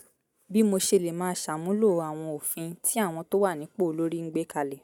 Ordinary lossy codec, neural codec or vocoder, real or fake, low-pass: none; none; real; 19.8 kHz